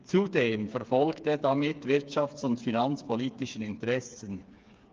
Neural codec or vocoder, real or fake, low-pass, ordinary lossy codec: codec, 16 kHz, 4 kbps, FreqCodec, smaller model; fake; 7.2 kHz; Opus, 24 kbps